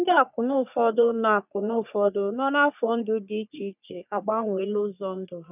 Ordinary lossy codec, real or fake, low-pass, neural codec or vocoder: none; fake; 3.6 kHz; codec, 44.1 kHz, 3.4 kbps, Pupu-Codec